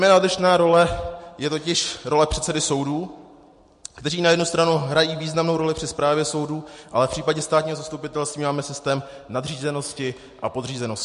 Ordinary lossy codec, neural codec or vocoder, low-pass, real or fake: MP3, 48 kbps; none; 10.8 kHz; real